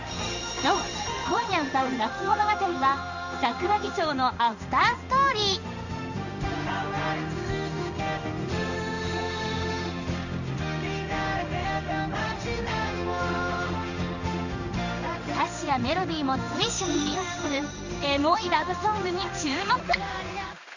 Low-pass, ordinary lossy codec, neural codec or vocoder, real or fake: 7.2 kHz; none; codec, 16 kHz in and 24 kHz out, 1 kbps, XY-Tokenizer; fake